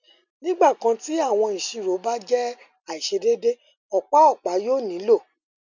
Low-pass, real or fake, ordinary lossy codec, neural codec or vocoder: 7.2 kHz; real; none; none